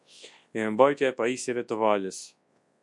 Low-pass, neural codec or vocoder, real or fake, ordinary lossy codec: 10.8 kHz; codec, 24 kHz, 0.9 kbps, WavTokenizer, large speech release; fake; MP3, 96 kbps